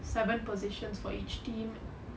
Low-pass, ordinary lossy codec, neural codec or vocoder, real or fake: none; none; none; real